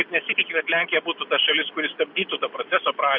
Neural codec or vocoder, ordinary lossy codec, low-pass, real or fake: none; MP3, 48 kbps; 5.4 kHz; real